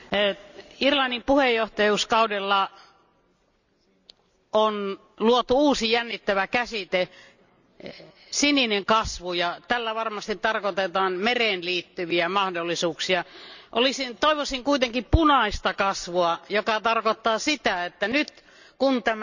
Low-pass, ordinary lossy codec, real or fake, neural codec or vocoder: 7.2 kHz; none; real; none